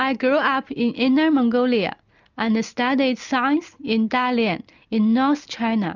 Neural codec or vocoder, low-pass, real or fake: none; 7.2 kHz; real